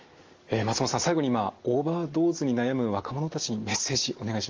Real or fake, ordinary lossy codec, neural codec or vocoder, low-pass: real; Opus, 32 kbps; none; 7.2 kHz